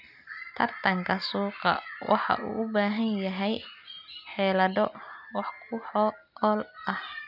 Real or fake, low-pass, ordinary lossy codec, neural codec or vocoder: real; 5.4 kHz; none; none